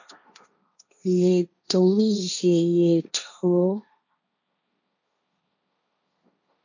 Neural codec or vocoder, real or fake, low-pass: codec, 16 kHz, 1.1 kbps, Voila-Tokenizer; fake; 7.2 kHz